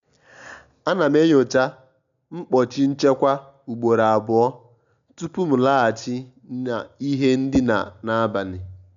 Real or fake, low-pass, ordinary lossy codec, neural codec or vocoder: real; 7.2 kHz; none; none